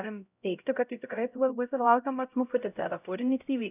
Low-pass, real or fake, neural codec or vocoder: 3.6 kHz; fake; codec, 16 kHz, 0.5 kbps, X-Codec, HuBERT features, trained on LibriSpeech